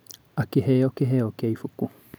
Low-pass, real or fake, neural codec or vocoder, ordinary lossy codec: none; real; none; none